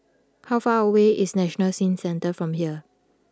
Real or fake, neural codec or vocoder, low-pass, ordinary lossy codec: real; none; none; none